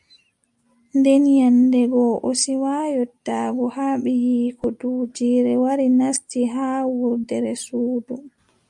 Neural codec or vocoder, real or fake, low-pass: none; real; 10.8 kHz